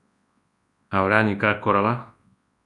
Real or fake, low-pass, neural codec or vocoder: fake; 10.8 kHz; codec, 24 kHz, 0.9 kbps, WavTokenizer, large speech release